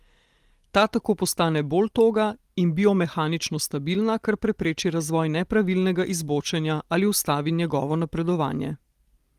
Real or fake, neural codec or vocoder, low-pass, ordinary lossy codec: real; none; 14.4 kHz; Opus, 24 kbps